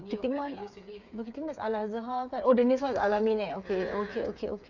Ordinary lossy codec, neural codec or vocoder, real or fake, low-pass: none; codec, 16 kHz, 8 kbps, FreqCodec, smaller model; fake; 7.2 kHz